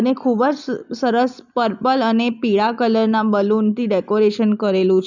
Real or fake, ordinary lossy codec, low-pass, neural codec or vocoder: real; none; 7.2 kHz; none